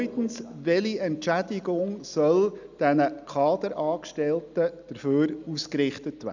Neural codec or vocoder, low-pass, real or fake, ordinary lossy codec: none; 7.2 kHz; real; none